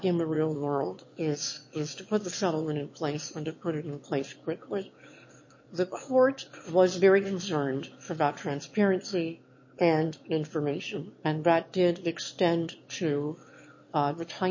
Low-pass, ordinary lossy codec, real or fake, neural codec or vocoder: 7.2 kHz; MP3, 32 kbps; fake; autoencoder, 22.05 kHz, a latent of 192 numbers a frame, VITS, trained on one speaker